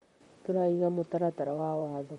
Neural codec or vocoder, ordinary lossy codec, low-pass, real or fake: vocoder, 44.1 kHz, 128 mel bands, Pupu-Vocoder; MP3, 48 kbps; 19.8 kHz; fake